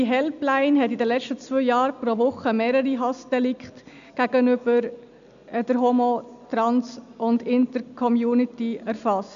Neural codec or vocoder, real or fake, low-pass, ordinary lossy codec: none; real; 7.2 kHz; none